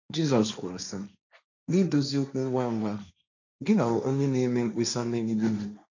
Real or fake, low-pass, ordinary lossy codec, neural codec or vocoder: fake; 7.2 kHz; none; codec, 16 kHz, 1.1 kbps, Voila-Tokenizer